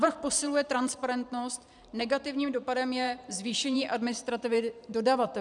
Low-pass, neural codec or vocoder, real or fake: 10.8 kHz; vocoder, 44.1 kHz, 128 mel bands every 512 samples, BigVGAN v2; fake